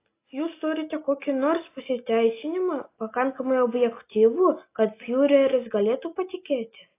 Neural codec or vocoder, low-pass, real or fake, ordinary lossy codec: none; 3.6 kHz; real; AAC, 24 kbps